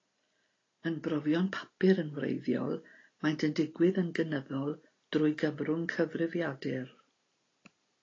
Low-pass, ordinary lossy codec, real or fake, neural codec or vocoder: 7.2 kHz; AAC, 32 kbps; real; none